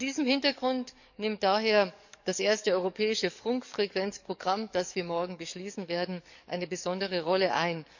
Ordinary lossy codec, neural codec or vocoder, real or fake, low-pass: none; codec, 44.1 kHz, 7.8 kbps, DAC; fake; 7.2 kHz